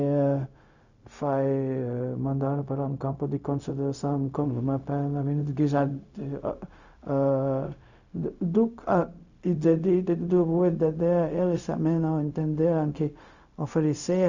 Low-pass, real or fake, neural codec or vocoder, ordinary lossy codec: 7.2 kHz; fake; codec, 16 kHz, 0.4 kbps, LongCat-Audio-Codec; none